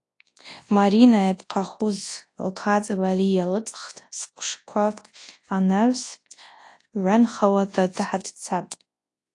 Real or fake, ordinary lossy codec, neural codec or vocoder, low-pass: fake; AAC, 48 kbps; codec, 24 kHz, 0.9 kbps, WavTokenizer, large speech release; 10.8 kHz